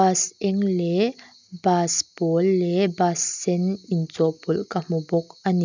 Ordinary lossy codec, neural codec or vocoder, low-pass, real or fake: none; none; 7.2 kHz; real